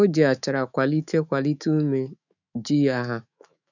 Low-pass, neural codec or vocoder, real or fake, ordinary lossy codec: 7.2 kHz; autoencoder, 48 kHz, 128 numbers a frame, DAC-VAE, trained on Japanese speech; fake; none